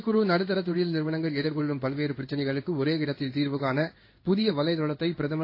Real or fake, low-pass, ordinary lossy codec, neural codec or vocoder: fake; 5.4 kHz; AAC, 32 kbps; codec, 16 kHz in and 24 kHz out, 1 kbps, XY-Tokenizer